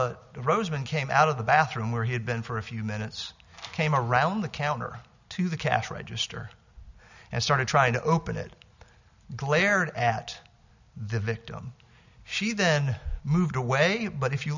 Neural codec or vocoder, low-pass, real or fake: none; 7.2 kHz; real